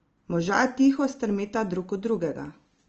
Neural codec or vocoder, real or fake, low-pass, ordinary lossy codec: none; real; 7.2 kHz; Opus, 24 kbps